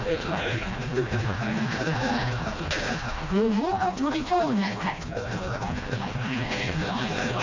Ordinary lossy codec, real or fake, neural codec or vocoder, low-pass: MP3, 48 kbps; fake; codec, 16 kHz, 1 kbps, FreqCodec, smaller model; 7.2 kHz